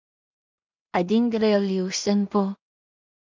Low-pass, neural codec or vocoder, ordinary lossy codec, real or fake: 7.2 kHz; codec, 16 kHz in and 24 kHz out, 0.4 kbps, LongCat-Audio-Codec, two codebook decoder; MP3, 64 kbps; fake